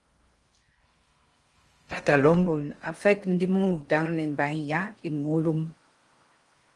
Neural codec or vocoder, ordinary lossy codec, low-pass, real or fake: codec, 16 kHz in and 24 kHz out, 0.6 kbps, FocalCodec, streaming, 4096 codes; Opus, 24 kbps; 10.8 kHz; fake